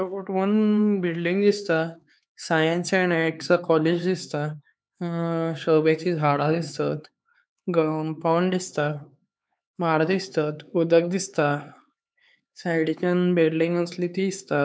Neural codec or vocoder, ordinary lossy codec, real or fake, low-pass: codec, 16 kHz, 4 kbps, X-Codec, HuBERT features, trained on LibriSpeech; none; fake; none